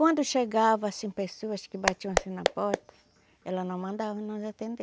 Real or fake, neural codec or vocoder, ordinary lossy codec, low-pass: real; none; none; none